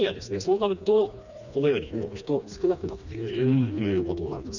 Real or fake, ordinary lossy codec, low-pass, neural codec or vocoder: fake; none; 7.2 kHz; codec, 16 kHz, 2 kbps, FreqCodec, smaller model